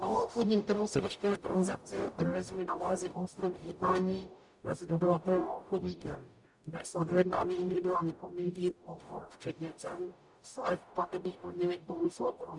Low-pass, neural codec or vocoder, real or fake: 10.8 kHz; codec, 44.1 kHz, 0.9 kbps, DAC; fake